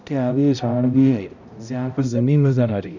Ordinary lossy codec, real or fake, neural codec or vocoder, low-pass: none; fake; codec, 16 kHz, 0.5 kbps, X-Codec, HuBERT features, trained on balanced general audio; 7.2 kHz